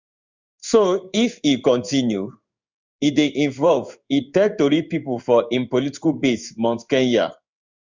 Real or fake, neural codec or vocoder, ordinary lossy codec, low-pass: fake; codec, 16 kHz in and 24 kHz out, 1 kbps, XY-Tokenizer; Opus, 64 kbps; 7.2 kHz